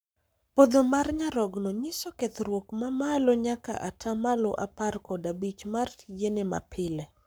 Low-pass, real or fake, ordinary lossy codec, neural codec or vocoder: none; fake; none; codec, 44.1 kHz, 7.8 kbps, Pupu-Codec